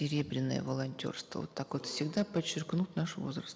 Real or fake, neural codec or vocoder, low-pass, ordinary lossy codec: real; none; none; none